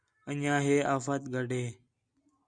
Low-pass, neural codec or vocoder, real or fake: 9.9 kHz; none; real